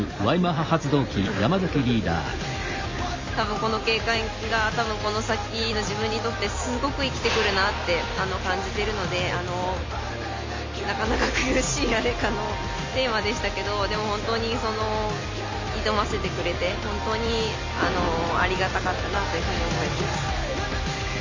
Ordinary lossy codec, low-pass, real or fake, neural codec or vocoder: MP3, 64 kbps; 7.2 kHz; real; none